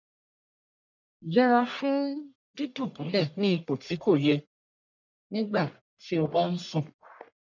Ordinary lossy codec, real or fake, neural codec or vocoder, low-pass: none; fake; codec, 44.1 kHz, 1.7 kbps, Pupu-Codec; 7.2 kHz